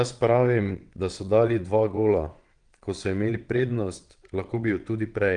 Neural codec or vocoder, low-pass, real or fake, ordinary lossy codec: vocoder, 22.05 kHz, 80 mel bands, WaveNeXt; 9.9 kHz; fake; Opus, 24 kbps